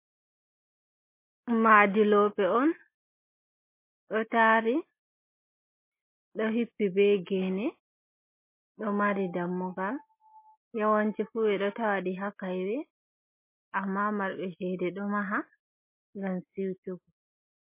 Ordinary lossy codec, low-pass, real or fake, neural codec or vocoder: MP3, 24 kbps; 3.6 kHz; real; none